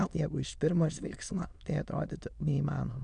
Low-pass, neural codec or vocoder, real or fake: 9.9 kHz; autoencoder, 22.05 kHz, a latent of 192 numbers a frame, VITS, trained on many speakers; fake